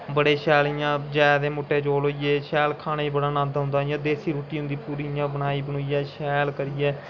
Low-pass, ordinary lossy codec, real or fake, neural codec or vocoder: 7.2 kHz; none; real; none